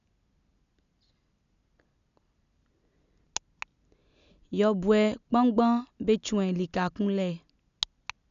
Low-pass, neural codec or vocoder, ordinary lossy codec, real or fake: 7.2 kHz; none; none; real